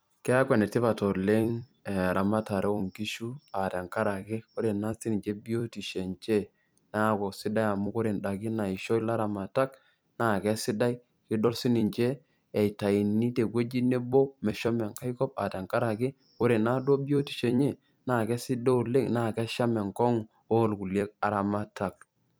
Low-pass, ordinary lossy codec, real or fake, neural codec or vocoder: none; none; fake; vocoder, 44.1 kHz, 128 mel bands every 256 samples, BigVGAN v2